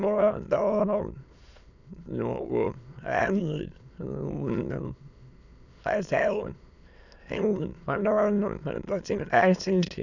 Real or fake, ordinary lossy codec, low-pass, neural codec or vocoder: fake; none; 7.2 kHz; autoencoder, 22.05 kHz, a latent of 192 numbers a frame, VITS, trained on many speakers